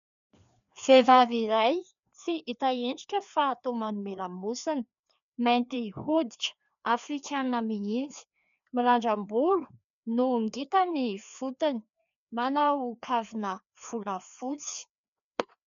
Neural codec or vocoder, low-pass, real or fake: codec, 16 kHz, 2 kbps, FreqCodec, larger model; 7.2 kHz; fake